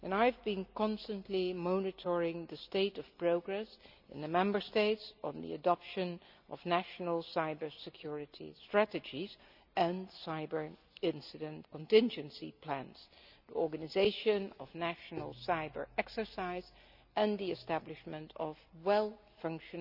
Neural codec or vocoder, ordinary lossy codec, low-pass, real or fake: none; none; 5.4 kHz; real